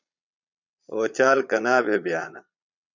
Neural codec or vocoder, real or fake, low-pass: vocoder, 22.05 kHz, 80 mel bands, Vocos; fake; 7.2 kHz